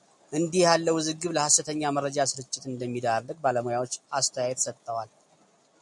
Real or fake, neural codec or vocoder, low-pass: real; none; 10.8 kHz